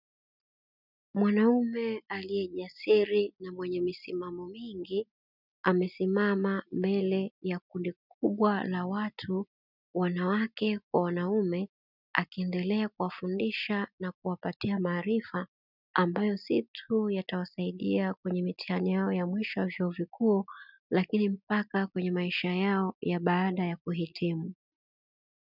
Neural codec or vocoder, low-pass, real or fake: none; 5.4 kHz; real